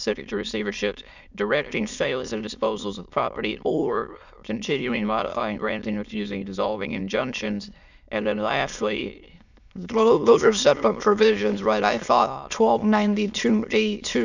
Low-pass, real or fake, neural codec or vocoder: 7.2 kHz; fake; autoencoder, 22.05 kHz, a latent of 192 numbers a frame, VITS, trained on many speakers